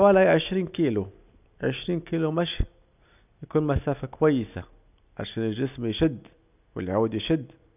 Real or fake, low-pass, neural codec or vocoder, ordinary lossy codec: real; 3.6 kHz; none; none